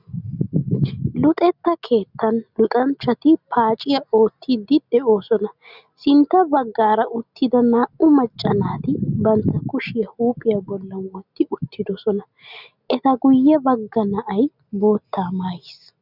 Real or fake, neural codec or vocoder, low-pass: real; none; 5.4 kHz